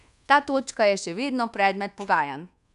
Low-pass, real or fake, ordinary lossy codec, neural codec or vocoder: 10.8 kHz; fake; none; codec, 24 kHz, 1.2 kbps, DualCodec